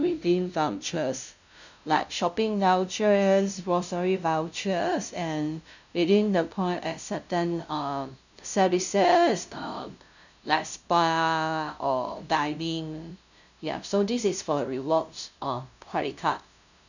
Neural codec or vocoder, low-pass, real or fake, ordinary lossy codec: codec, 16 kHz, 0.5 kbps, FunCodec, trained on LibriTTS, 25 frames a second; 7.2 kHz; fake; none